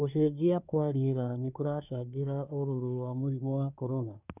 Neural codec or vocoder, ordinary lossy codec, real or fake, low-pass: codec, 24 kHz, 1 kbps, SNAC; none; fake; 3.6 kHz